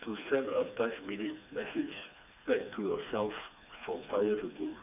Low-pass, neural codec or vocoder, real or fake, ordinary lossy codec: 3.6 kHz; codec, 16 kHz, 2 kbps, FreqCodec, smaller model; fake; none